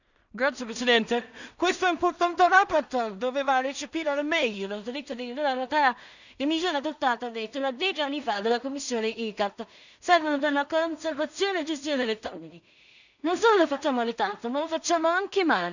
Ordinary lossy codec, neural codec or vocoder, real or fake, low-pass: none; codec, 16 kHz in and 24 kHz out, 0.4 kbps, LongCat-Audio-Codec, two codebook decoder; fake; 7.2 kHz